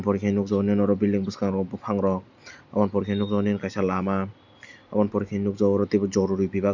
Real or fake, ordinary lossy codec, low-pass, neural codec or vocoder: real; none; 7.2 kHz; none